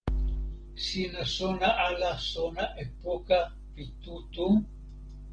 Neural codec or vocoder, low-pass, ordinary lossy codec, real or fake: none; 9.9 kHz; Opus, 16 kbps; real